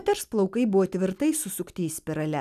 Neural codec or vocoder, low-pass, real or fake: none; 14.4 kHz; real